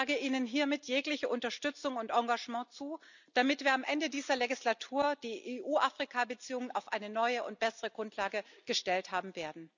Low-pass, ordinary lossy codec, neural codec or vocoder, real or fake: 7.2 kHz; none; none; real